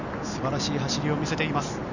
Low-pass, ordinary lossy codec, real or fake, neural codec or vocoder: 7.2 kHz; none; real; none